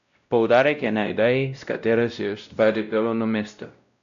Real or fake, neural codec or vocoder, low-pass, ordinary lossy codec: fake; codec, 16 kHz, 0.5 kbps, X-Codec, WavLM features, trained on Multilingual LibriSpeech; 7.2 kHz; none